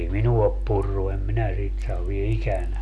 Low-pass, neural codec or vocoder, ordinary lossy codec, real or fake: none; none; none; real